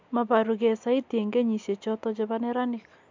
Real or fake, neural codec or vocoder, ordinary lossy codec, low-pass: real; none; MP3, 64 kbps; 7.2 kHz